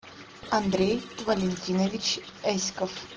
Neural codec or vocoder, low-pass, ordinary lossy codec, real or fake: none; 7.2 kHz; Opus, 16 kbps; real